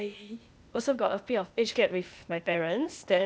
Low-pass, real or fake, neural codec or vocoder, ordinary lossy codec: none; fake; codec, 16 kHz, 0.8 kbps, ZipCodec; none